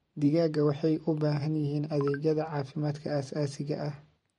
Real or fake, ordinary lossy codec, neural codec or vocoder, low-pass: fake; MP3, 48 kbps; vocoder, 44.1 kHz, 128 mel bands every 512 samples, BigVGAN v2; 19.8 kHz